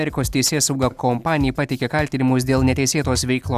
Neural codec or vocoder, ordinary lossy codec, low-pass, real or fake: none; Opus, 64 kbps; 14.4 kHz; real